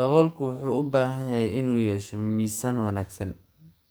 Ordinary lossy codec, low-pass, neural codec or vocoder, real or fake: none; none; codec, 44.1 kHz, 2.6 kbps, SNAC; fake